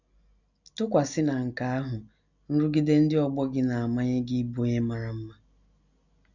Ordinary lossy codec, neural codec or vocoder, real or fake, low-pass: none; none; real; 7.2 kHz